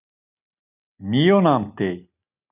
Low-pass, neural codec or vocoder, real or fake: 3.6 kHz; none; real